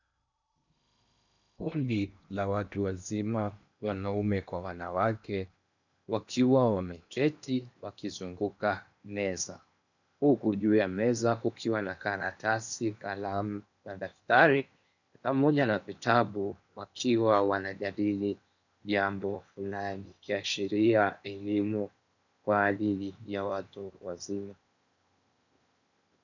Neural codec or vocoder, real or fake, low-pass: codec, 16 kHz in and 24 kHz out, 0.8 kbps, FocalCodec, streaming, 65536 codes; fake; 7.2 kHz